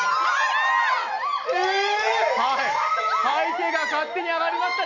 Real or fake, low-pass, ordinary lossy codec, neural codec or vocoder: real; 7.2 kHz; none; none